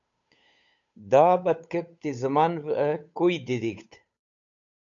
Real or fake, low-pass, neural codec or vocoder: fake; 7.2 kHz; codec, 16 kHz, 8 kbps, FunCodec, trained on Chinese and English, 25 frames a second